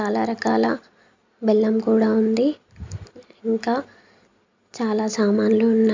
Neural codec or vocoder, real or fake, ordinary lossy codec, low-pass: none; real; MP3, 64 kbps; 7.2 kHz